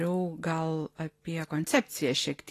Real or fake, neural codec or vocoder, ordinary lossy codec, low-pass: real; none; AAC, 48 kbps; 14.4 kHz